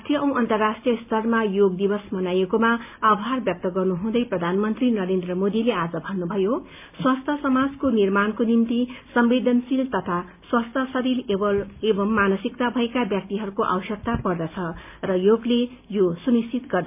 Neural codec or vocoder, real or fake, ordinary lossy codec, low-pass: none; real; MP3, 32 kbps; 3.6 kHz